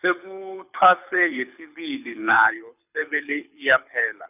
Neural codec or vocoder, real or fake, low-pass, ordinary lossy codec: codec, 24 kHz, 6 kbps, HILCodec; fake; 3.6 kHz; none